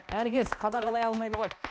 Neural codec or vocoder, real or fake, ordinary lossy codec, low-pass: codec, 16 kHz, 1 kbps, X-Codec, HuBERT features, trained on balanced general audio; fake; none; none